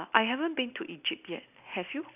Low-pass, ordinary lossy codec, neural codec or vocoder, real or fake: 3.6 kHz; none; none; real